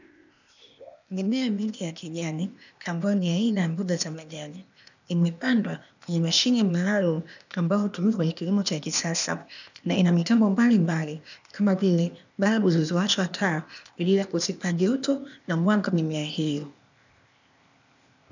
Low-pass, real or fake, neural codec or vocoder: 7.2 kHz; fake; codec, 16 kHz, 0.8 kbps, ZipCodec